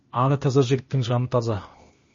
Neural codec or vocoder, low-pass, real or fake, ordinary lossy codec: codec, 16 kHz, 0.8 kbps, ZipCodec; 7.2 kHz; fake; MP3, 32 kbps